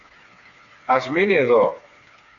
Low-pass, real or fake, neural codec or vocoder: 7.2 kHz; fake; codec, 16 kHz, 4 kbps, FreqCodec, smaller model